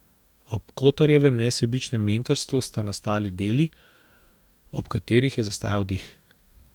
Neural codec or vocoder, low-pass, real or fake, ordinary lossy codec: codec, 44.1 kHz, 2.6 kbps, DAC; 19.8 kHz; fake; none